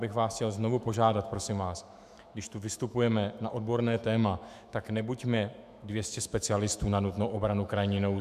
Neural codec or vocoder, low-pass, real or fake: autoencoder, 48 kHz, 128 numbers a frame, DAC-VAE, trained on Japanese speech; 14.4 kHz; fake